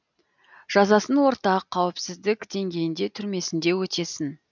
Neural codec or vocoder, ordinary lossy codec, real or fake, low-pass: none; none; real; 7.2 kHz